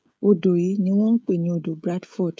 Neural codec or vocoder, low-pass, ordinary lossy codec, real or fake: codec, 16 kHz, 16 kbps, FreqCodec, smaller model; none; none; fake